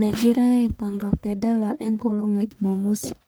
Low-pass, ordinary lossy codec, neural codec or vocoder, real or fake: none; none; codec, 44.1 kHz, 1.7 kbps, Pupu-Codec; fake